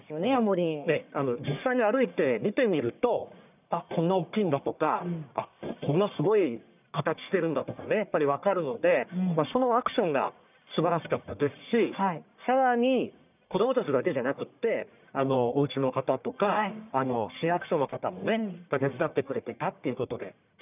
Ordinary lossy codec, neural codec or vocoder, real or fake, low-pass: none; codec, 44.1 kHz, 1.7 kbps, Pupu-Codec; fake; 3.6 kHz